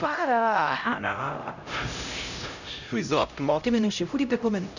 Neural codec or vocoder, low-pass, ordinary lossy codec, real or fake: codec, 16 kHz, 0.5 kbps, X-Codec, HuBERT features, trained on LibriSpeech; 7.2 kHz; none; fake